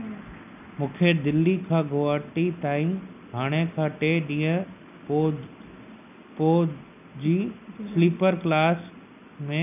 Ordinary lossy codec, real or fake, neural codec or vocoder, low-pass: none; real; none; 3.6 kHz